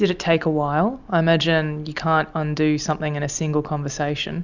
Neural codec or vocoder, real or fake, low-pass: none; real; 7.2 kHz